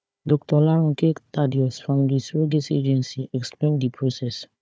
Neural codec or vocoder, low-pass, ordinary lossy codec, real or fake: codec, 16 kHz, 4 kbps, FunCodec, trained on Chinese and English, 50 frames a second; none; none; fake